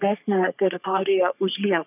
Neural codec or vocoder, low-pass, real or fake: codec, 44.1 kHz, 3.4 kbps, Pupu-Codec; 3.6 kHz; fake